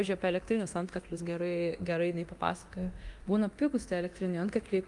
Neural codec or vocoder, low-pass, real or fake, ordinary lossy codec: codec, 24 kHz, 0.9 kbps, DualCodec; 10.8 kHz; fake; Opus, 32 kbps